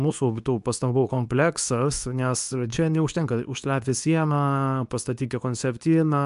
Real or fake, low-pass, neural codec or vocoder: fake; 10.8 kHz; codec, 24 kHz, 0.9 kbps, WavTokenizer, medium speech release version 2